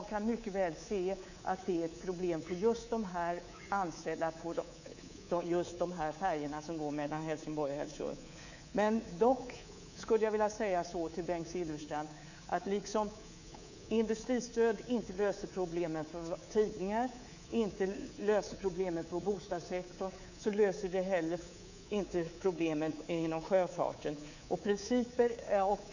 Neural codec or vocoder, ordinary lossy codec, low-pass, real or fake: codec, 24 kHz, 3.1 kbps, DualCodec; none; 7.2 kHz; fake